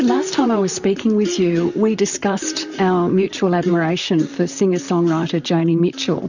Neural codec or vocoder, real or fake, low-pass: vocoder, 44.1 kHz, 128 mel bands, Pupu-Vocoder; fake; 7.2 kHz